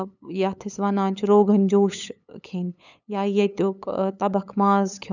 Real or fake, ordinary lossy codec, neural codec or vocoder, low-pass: fake; none; codec, 16 kHz, 8 kbps, FunCodec, trained on LibriTTS, 25 frames a second; 7.2 kHz